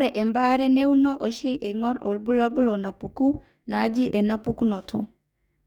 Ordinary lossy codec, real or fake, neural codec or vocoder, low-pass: none; fake; codec, 44.1 kHz, 2.6 kbps, DAC; 19.8 kHz